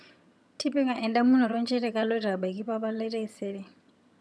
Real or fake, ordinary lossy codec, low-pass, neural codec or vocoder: fake; none; none; vocoder, 22.05 kHz, 80 mel bands, HiFi-GAN